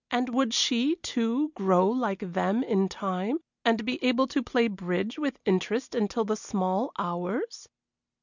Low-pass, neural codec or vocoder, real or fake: 7.2 kHz; none; real